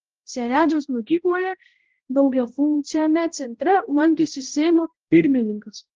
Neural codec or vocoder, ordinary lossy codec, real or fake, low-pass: codec, 16 kHz, 0.5 kbps, X-Codec, HuBERT features, trained on balanced general audio; Opus, 16 kbps; fake; 7.2 kHz